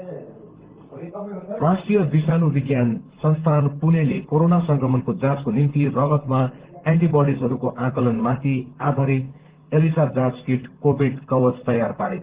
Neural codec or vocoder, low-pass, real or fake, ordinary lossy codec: vocoder, 44.1 kHz, 128 mel bands, Pupu-Vocoder; 3.6 kHz; fake; Opus, 16 kbps